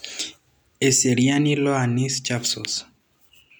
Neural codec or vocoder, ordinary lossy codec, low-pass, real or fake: none; none; none; real